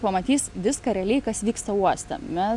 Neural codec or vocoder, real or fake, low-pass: none; real; 10.8 kHz